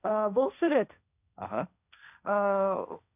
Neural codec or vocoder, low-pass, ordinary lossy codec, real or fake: codec, 16 kHz, 1.1 kbps, Voila-Tokenizer; 3.6 kHz; none; fake